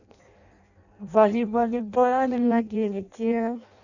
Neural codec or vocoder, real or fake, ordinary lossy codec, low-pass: codec, 16 kHz in and 24 kHz out, 0.6 kbps, FireRedTTS-2 codec; fake; none; 7.2 kHz